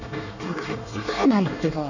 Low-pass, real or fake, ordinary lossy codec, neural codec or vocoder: 7.2 kHz; fake; none; codec, 24 kHz, 1 kbps, SNAC